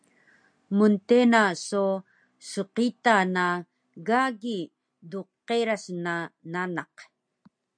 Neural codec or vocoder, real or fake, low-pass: none; real; 9.9 kHz